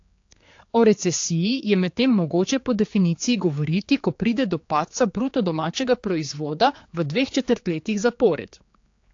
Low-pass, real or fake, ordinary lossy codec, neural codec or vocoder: 7.2 kHz; fake; AAC, 48 kbps; codec, 16 kHz, 4 kbps, X-Codec, HuBERT features, trained on general audio